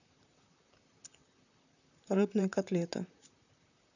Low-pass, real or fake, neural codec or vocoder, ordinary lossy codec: 7.2 kHz; fake; vocoder, 44.1 kHz, 128 mel bands, Pupu-Vocoder; none